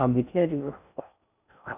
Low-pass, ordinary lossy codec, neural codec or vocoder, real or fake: 3.6 kHz; none; codec, 16 kHz in and 24 kHz out, 0.6 kbps, FocalCodec, streaming, 2048 codes; fake